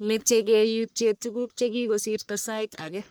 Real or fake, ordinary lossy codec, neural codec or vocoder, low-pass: fake; none; codec, 44.1 kHz, 1.7 kbps, Pupu-Codec; none